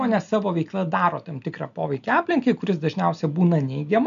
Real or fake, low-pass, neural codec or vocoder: real; 7.2 kHz; none